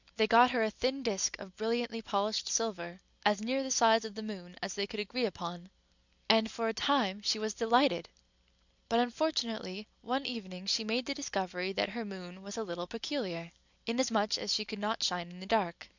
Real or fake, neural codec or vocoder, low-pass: real; none; 7.2 kHz